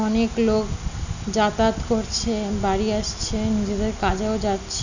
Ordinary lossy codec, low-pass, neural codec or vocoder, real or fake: none; 7.2 kHz; none; real